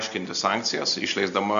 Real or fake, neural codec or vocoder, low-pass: real; none; 7.2 kHz